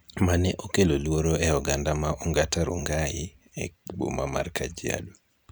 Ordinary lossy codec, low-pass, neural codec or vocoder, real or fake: none; none; none; real